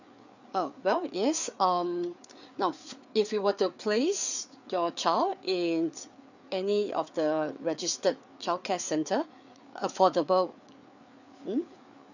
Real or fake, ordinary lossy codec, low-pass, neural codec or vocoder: fake; none; 7.2 kHz; codec, 16 kHz, 4 kbps, FreqCodec, larger model